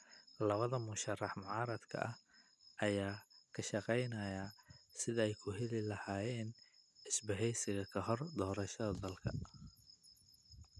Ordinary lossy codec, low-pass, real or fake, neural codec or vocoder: none; none; real; none